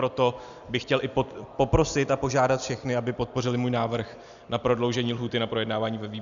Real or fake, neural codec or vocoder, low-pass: real; none; 7.2 kHz